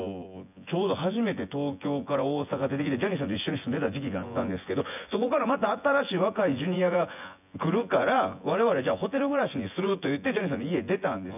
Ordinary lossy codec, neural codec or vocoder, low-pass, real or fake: none; vocoder, 24 kHz, 100 mel bands, Vocos; 3.6 kHz; fake